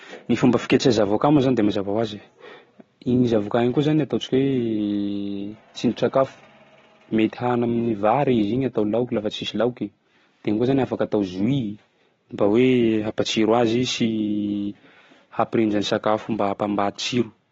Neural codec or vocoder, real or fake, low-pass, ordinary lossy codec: none; real; 7.2 kHz; AAC, 24 kbps